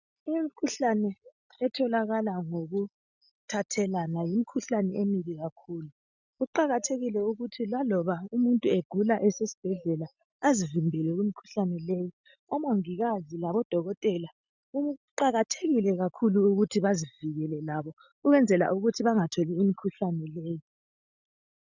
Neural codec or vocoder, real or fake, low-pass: none; real; 7.2 kHz